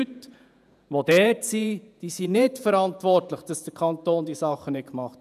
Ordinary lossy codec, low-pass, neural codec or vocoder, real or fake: none; 14.4 kHz; vocoder, 48 kHz, 128 mel bands, Vocos; fake